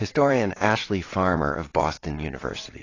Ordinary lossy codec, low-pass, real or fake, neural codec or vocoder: AAC, 32 kbps; 7.2 kHz; fake; vocoder, 22.05 kHz, 80 mel bands, WaveNeXt